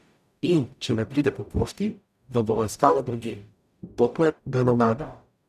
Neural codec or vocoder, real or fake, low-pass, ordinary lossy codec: codec, 44.1 kHz, 0.9 kbps, DAC; fake; 14.4 kHz; none